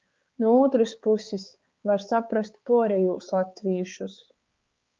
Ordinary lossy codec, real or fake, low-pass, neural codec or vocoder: Opus, 32 kbps; fake; 7.2 kHz; codec, 16 kHz, 4 kbps, X-Codec, HuBERT features, trained on balanced general audio